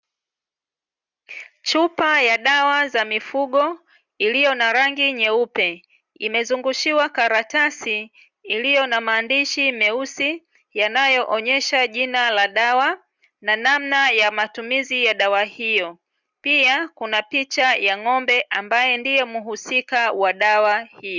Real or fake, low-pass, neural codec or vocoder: real; 7.2 kHz; none